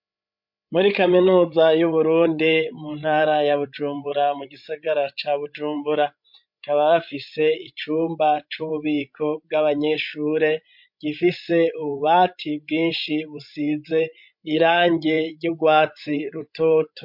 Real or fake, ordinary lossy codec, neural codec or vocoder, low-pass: fake; MP3, 48 kbps; codec, 16 kHz, 16 kbps, FreqCodec, larger model; 5.4 kHz